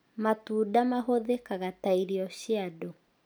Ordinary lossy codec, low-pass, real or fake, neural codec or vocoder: none; none; real; none